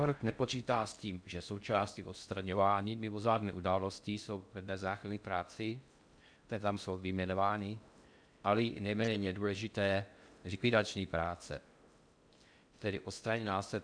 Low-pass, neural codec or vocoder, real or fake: 9.9 kHz; codec, 16 kHz in and 24 kHz out, 0.6 kbps, FocalCodec, streaming, 4096 codes; fake